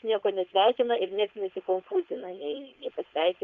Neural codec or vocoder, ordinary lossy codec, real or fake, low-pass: codec, 16 kHz, 4.8 kbps, FACodec; Opus, 64 kbps; fake; 7.2 kHz